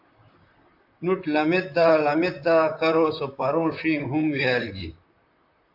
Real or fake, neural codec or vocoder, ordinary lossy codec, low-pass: fake; vocoder, 44.1 kHz, 128 mel bands, Pupu-Vocoder; AAC, 48 kbps; 5.4 kHz